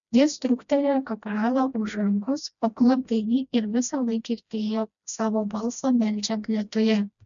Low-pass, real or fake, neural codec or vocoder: 7.2 kHz; fake; codec, 16 kHz, 1 kbps, FreqCodec, smaller model